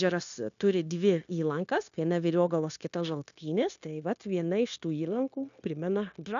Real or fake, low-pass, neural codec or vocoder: fake; 7.2 kHz; codec, 16 kHz, 0.9 kbps, LongCat-Audio-Codec